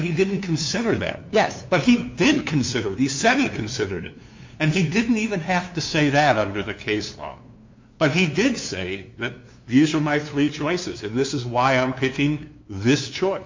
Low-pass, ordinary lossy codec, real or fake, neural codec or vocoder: 7.2 kHz; MP3, 48 kbps; fake; codec, 16 kHz, 2 kbps, FunCodec, trained on LibriTTS, 25 frames a second